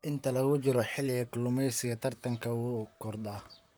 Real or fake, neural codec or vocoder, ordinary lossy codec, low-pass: fake; vocoder, 44.1 kHz, 128 mel bands, Pupu-Vocoder; none; none